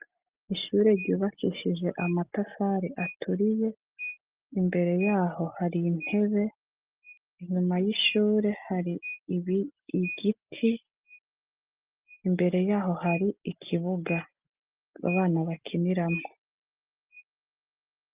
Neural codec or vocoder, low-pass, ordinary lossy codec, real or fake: none; 3.6 kHz; Opus, 32 kbps; real